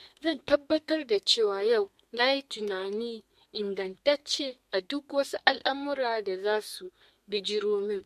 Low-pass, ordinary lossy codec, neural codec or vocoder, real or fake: 14.4 kHz; MP3, 64 kbps; codec, 32 kHz, 1.9 kbps, SNAC; fake